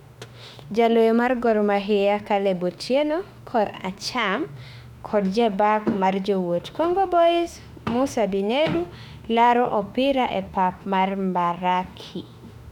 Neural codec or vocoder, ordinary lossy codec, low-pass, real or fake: autoencoder, 48 kHz, 32 numbers a frame, DAC-VAE, trained on Japanese speech; none; 19.8 kHz; fake